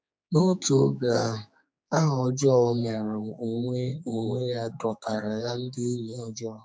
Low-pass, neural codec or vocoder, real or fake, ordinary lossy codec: none; codec, 16 kHz, 4 kbps, X-Codec, HuBERT features, trained on general audio; fake; none